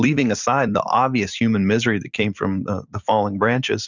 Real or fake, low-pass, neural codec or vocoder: fake; 7.2 kHz; vocoder, 44.1 kHz, 128 mel bands every 256 samples, BigVGAN v2